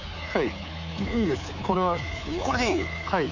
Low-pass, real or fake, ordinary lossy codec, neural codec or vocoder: 7.2 kHz; fake; none; codec, 24 kHz, 3.1 kbps, DualCodec